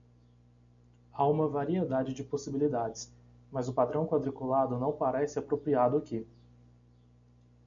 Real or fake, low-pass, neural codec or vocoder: real; 7.2 kHz; none